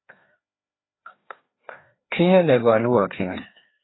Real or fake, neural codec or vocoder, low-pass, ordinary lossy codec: fake; codec, 16 kHz, 2 kbps, FreqCodec, larger model; 7.2 kHz; AAC, 16 kbps